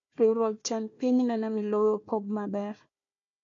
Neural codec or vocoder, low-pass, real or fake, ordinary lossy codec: codec, 16 kHz, 1 kbps, FunCodec, trained on Chinese and English, 50 frames a second; 7.2 kHz; fake; AAC, 48 kbps